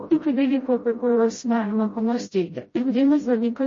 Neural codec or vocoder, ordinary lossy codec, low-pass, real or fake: codec, 16 kHz, 0.5 kbps, FreqCodec, smaller model; MP3, 32 kbps; 7.2 kHz; fake